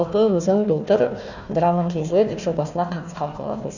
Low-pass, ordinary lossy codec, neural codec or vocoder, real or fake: 7.2 kHz; none; codec, 16 kHz, 1 kbps, FunCodec, trained on Chinese and English, 50 frames a second; fake